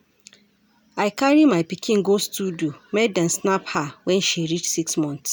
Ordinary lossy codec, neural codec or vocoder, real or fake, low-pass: none; none; real; none